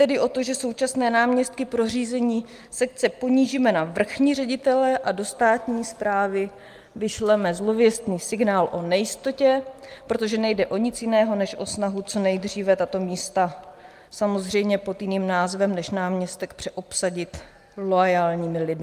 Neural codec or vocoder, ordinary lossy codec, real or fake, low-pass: none; Opus, 32 kbps; real; 14.4 kHz